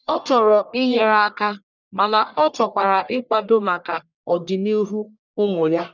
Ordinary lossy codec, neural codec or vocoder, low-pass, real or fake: none; codec, 44.1 kHz, 1.7 kbps, Pupu-Codec; 7.2 kHz; fake